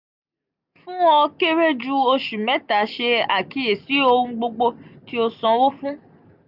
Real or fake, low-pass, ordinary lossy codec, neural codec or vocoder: real; 5.4 kHz; none; none